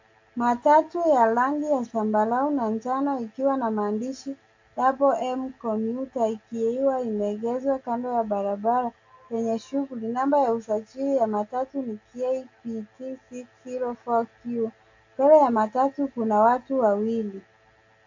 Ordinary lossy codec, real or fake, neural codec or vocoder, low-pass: AAC, 48 kbps; real; none; 7.2 kHz